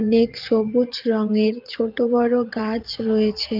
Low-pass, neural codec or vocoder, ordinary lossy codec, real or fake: 5.4 kHz; vocoder, 22.05 kHz, 80 mel bands, Vocos; Opus, 24 kbps; fake